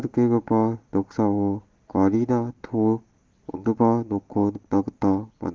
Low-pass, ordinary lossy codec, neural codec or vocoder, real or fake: 7.2 kHz; Opus, 16 kbps; none; real